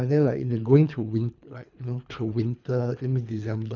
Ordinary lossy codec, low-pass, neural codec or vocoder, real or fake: none; 7.2 kHz; codec, 24 kHz, 3 kbps, HILCodec; fake